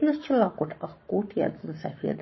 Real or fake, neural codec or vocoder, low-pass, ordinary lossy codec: fake; codec, 44.1 kHz, 7.8 kbps, Pupu-Codec; 7.2 kHz; MP3, 24 kbps